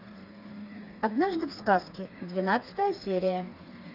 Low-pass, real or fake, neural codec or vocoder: 5.4 kHz; fake; codec, 16 kHz, 4 kbps, FreqCodec, smaller model